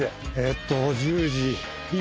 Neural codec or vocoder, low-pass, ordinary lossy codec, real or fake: none; none; none; real